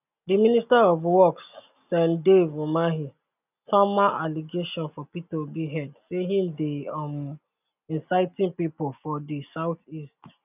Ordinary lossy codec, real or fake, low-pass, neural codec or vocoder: none; real; 3.6 kHz; none